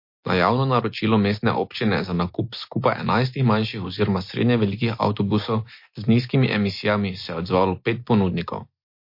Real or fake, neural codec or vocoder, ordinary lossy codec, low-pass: real; none; MP3, 32 kbps; 5.4 kHz